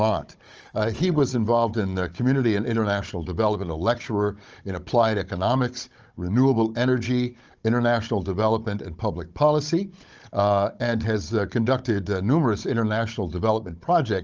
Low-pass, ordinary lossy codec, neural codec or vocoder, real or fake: 7.2 kHz; Opus, 24 kbps; codec, 16 kHz, 16 kbps, FunCodec, trained on Chinese and English, 50 frames a second; fake